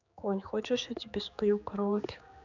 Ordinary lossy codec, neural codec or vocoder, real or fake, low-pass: none; codec, 16 kHz, 4 kbps, X-Codec, HuBERT features, trained on general audio; fake; 7.2 kHz